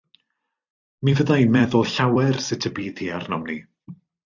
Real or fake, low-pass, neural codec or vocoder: fake; 7.2 kHz; vocoder, 44.1 kHz, 128 mel bands every 256 samples, BigVGAN v2